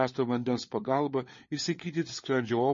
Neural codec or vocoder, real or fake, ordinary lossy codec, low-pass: codec, 16 kHz, 4 kbps, FunCodec, trained on LibriTTS, 50 frames a second; fake; MP3, 32 kbps; 7.2 kHz